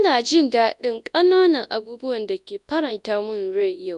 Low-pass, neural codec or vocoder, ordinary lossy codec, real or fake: 10.8 kHz; codec, 24 kHz, 0.9 kbps, WavTokenizer, large speech release; none; fake